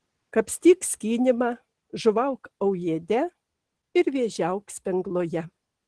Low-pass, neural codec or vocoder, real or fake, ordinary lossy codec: 10.8 kHz; none; real; Opus, 16 kbps